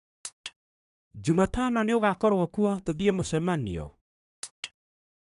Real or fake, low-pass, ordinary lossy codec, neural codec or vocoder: fake; 10.8 kHz; none; codec, 24 kHz, 1 kbps, SNAC